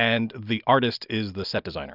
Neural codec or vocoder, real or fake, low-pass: none; real; 5.4 kHz